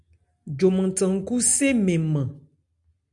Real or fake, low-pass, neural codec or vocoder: real; 10.8 kHz; none